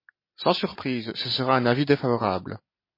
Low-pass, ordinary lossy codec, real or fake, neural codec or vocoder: 5.4 kHz; MP3, 24 kbps; real; none